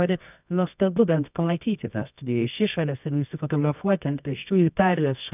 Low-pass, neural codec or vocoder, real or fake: 3.6 kHz; codec, 24 kHz, 0.9 kbps, WavTokenizer, medium music audio release; fake